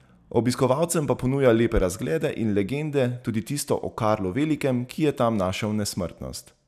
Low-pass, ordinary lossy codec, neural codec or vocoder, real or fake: 14.4 kHz; none; none; real